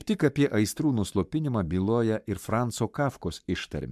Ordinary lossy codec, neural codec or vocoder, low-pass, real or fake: MP3, 96 kbps; codec, 44.1 kHz, 7.8 kbps, Pupu-Codec; 14.4 kHz; fake